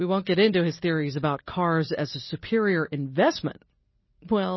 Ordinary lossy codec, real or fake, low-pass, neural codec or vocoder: MP3, 24 kbps; real; 7.2 kHz; none